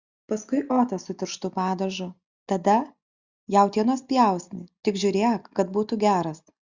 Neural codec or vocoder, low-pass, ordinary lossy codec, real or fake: none; 7.2 kHz; Opus, 64 kbps; real